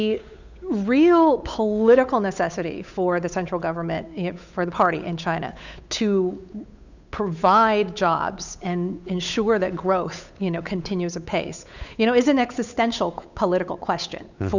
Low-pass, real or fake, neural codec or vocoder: 7.2 kHz; fake; codec, 16 kHz, 8 kbps, FunCodec, trained on Chinese and English, 25 frames a second